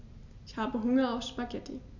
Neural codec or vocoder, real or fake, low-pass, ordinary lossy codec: none; real; 7.2 kHz; none